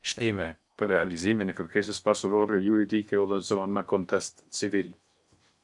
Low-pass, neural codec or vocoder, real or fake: 10.8 kHz; codec, 16 kHz in and 24 kHz out, 0.6 kbps, FocalCodec, streaming, 4096 codes; fake